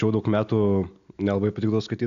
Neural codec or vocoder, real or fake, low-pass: none; real; 7.2 kHz